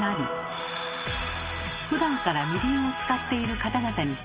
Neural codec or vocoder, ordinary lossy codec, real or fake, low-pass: none; Opus, 32 kbps; real; 3.6 kHz